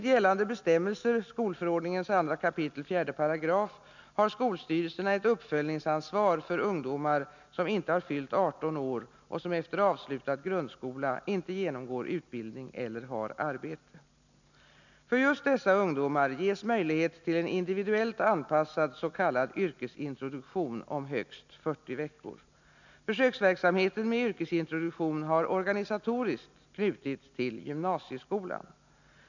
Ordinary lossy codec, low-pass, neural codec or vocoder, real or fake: none; 7.2 kHz; none; real